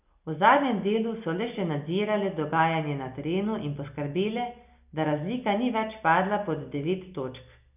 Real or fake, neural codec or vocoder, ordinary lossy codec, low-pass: real; none; none; 3.6 kHz